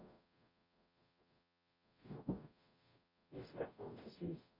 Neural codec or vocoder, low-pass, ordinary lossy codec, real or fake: codec, 44.1 kHz, 0.9 kbps, DAC; 5.4 kHz; MP3, 48 kbps; fake